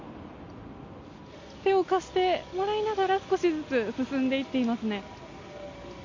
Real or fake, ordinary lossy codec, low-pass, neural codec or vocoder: real; MP3, 48 kbps; 7.2 kHz; none